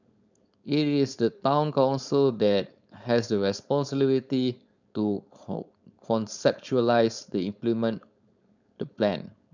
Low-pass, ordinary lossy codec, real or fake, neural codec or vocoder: 7.2 kHz; none; fake; codec, 16 kHz, 4.8 kbps, FACodec